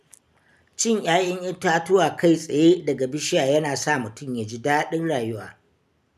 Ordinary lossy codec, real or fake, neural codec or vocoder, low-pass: none; real; none; 14.4 kHz